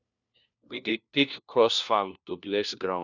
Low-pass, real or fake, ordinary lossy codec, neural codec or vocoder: 7.2 kHz; fake; none; codec, 16 kHz, 1 kbps, FunCodec, trained on LibriTTS, 50 frames a second